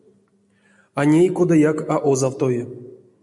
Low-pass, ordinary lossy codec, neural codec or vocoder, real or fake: 10.8 kHz; MP3, 64 kbps; none; real